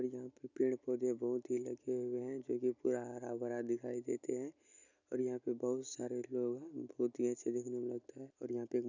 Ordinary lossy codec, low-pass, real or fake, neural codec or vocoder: none; 7.2 kHz; real; none